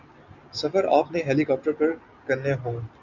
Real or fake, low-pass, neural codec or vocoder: real; 7.2 kHz; none